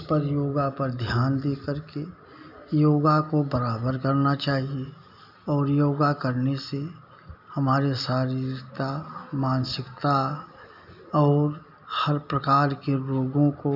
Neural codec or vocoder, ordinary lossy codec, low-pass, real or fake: none; none; 5.4 kHz; real